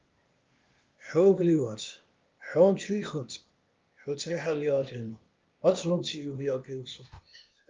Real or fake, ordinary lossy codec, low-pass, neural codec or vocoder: fake; Opus, 32 kbps; 7.2 kHz; codec, 16 kHz, 0.8 kbps, ZipCodec